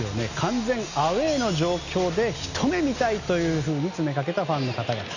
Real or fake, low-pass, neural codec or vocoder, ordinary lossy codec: real; 7.2 kHz; none; none